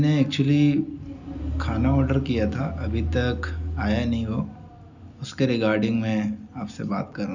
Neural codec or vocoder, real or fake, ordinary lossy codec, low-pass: none; real; none; 7.2 kHz